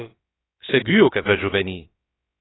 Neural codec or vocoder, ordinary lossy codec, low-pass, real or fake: codec, 16 kHz, about 1 kbps, DyCAST, with the encoder's durations; AAC, 16 kbps; 7.2 kHz; fake